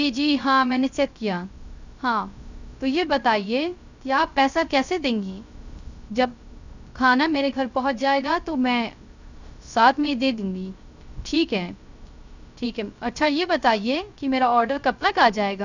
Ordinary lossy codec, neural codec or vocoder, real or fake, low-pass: none; codec, 16 kHz, 0.3 kbps, FocalCodec; fake; 7.2 kHz